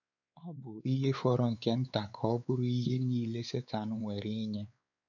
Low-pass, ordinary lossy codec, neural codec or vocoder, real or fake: 7.2 kHz; none; codec, 16 kHz, 4 kbps, X-Codec, WavLM features, trained on Multilingual LibriSpeech; fake